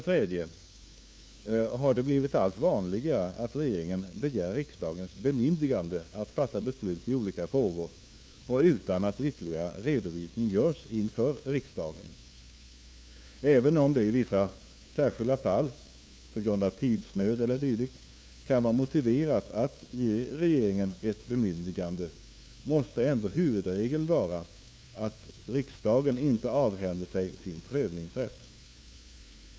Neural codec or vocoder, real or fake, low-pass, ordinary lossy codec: codec, 16 kHz, 2 kbps, FunCodec, trained on LibriTTS, 25 frames a second; fake; none; none